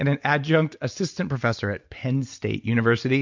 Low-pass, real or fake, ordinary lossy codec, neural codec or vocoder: 7.2 kHz; real; MP3, 64 kbps; none